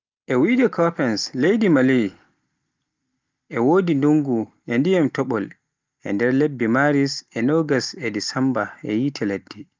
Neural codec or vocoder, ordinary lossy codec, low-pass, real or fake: none; Opus, 24 kbps; 7.2 kHz; real